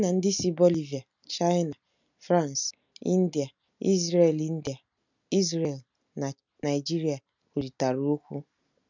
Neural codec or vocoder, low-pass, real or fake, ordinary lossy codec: none; 7.2 kHz; real; none